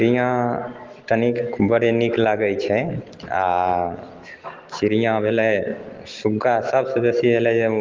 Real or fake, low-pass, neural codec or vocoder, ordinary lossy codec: real; 7.2 kHz; none; Opus, 16 kbps